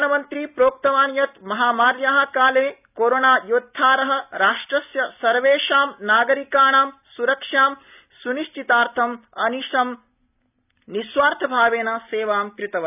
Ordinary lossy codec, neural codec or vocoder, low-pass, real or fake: none; none; 3.6 kHz; real